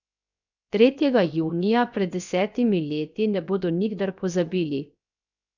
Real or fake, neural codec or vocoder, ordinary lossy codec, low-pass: fake; codec, 16 kHz, 0.7 kbps, FocalCodec; none; 7.2 kHz